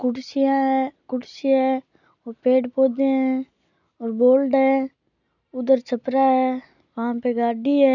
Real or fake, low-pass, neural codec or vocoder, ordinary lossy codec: real; 7.2 kHz; none; none